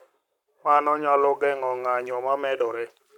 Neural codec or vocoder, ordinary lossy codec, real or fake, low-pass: codec, 44.1 kHz, 7.8 kbps, Pupu-Codec; none; fake; 19.8 kHz